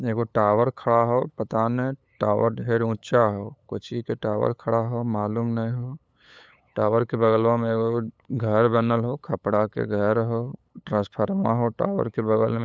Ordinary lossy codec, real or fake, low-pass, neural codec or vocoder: none; fake; none; codec, 16 kHz, 8 kbps, FunCodec, trained on LibriTTS, 25 frames a second